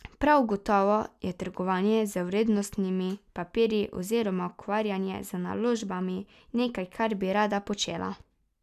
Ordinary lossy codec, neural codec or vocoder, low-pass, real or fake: none; none; 14.4 kHz; real